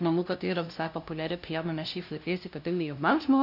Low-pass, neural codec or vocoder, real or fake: 5.4 kHz; codec, 16 kHz, 0.5 kbps, FunCodec, trained on LibriTTS, 25 frames a second; fake